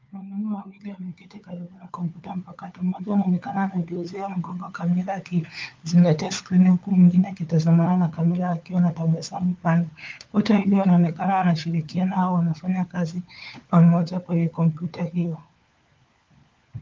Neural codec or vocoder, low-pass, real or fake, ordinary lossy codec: codec, 16 kHz, 4 kbps, FunCodec, trained on LibriTTS, 50 frames a second; 7.2 kHz; fake; Opus, 32 kbps